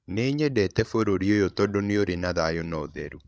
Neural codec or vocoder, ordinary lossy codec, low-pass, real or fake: codec, 16 kHz, 16 kbps, FreqCodec, larger model; none; none; fake